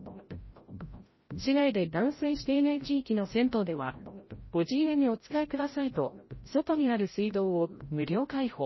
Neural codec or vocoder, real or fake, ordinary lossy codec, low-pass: codec, 16 kHz, 0.5 kbps, FreqCodec, larger model; fake; MP3, 24 kbps; 7.2 kHz